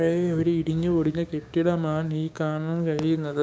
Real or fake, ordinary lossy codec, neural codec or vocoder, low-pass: fake; none; codec, 16 kHz, 6 kbps, DAC; none